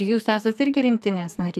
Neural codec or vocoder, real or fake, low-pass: codec, 44.1 kHz, 2.6 kbps, SNAC; fake; 14.4 kHz